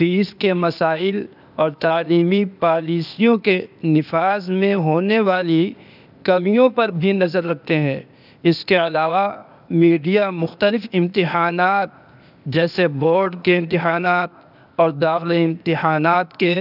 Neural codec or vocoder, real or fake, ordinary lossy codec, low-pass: codec, 16 kHz, 0.8 kbps, ZipCodec; fake; none; 5.4 kHz